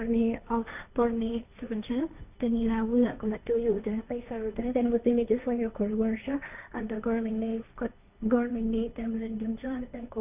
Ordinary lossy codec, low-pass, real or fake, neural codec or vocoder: none; 3.6 kHz; fake; codec, 16 kHz, 1.1 kbps, Voila-Tokenizer